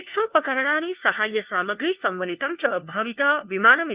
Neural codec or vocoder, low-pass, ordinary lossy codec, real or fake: codec, 16 kHz, 1 kbps, FunCodec, trained on LibriTTS, 50 frames a second; 3.6 kHz; Opus, 32 kbps; fake